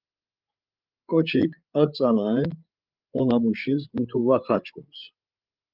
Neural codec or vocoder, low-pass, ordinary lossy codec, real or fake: codec, 16 kHz, 8 kbps, FreqCodec, larger model; 5.4 kHz; Opus, 32 kbps; fake